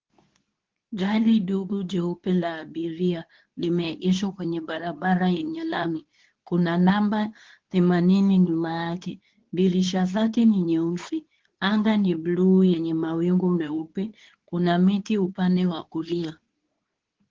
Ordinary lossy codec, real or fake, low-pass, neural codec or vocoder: Opus, 16 kbps; fake; 7.2 kHz; codec, 24 kHz, 0.9 kbps, WavTokenizer, medium speech release version 2